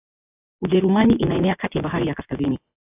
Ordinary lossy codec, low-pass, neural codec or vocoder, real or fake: AAC, 32 kbps; 3.6 kHz; vocoder, 24 kHz, 100 mel bands, Vocos; fake